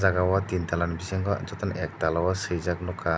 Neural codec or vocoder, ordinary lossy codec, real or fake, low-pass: none; none; real; none